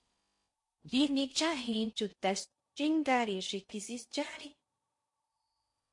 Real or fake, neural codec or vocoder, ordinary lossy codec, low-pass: fake; codec, 16 kHz in and 24 kHz out, 0.6 kbps, FocalCodec, streaming, 4096 codes; MP3, 48 kbps; 10.8 kHz